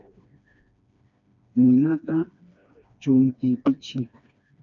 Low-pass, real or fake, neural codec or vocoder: 7.2 kHz; fake; codec, 16 kHz, 2 kbps, FreqCodec, smaller model